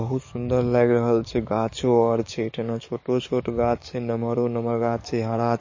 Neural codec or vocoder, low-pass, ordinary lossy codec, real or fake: codec, 44.1 kHz, 7.8 kbps, DAC; 7.2 kHz; MP3, 32 kbps; fake